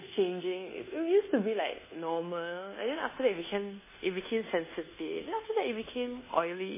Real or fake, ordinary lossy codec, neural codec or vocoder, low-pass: fake; MP3, 16 kbps; codec, 24 kHz, 1.2 kbps, DualCodec; 3.6 kHz